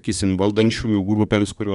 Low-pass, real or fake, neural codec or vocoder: 10.8 kHz; fake; codec, 24 kHz, 1 kbps, SNAC